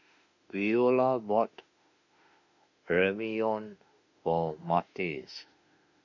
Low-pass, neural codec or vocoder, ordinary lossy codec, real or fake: 7.2 kHz; autoencoder, 48 kHz, 32 numbers a frame, DAC-VAE, trained on Japanese speech; none; fake